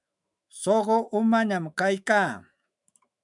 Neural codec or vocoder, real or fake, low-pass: autoencoder, 48 kHz, 128 numbers a frame, DAC-VAE, trained on Japanese speech; fake; 10.8 kHz